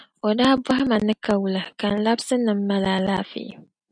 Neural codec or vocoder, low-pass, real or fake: none; 9.9 kHz; real